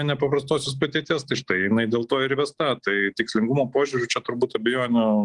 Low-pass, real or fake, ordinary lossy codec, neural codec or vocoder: 10.8 kHz; real; Opus, 24 kbps; none